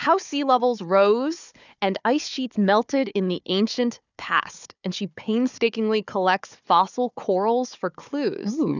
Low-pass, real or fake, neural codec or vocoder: 7.2 kHz; fake; codec, 16 kHz, 4 kbps, FunCodec, trained on Chinese and English, 50 frames a second